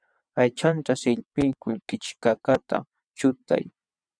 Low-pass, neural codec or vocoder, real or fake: 9.9 kHz; vocoder, 22.05 kHz, 80 mel bands, WaveNeXt; fake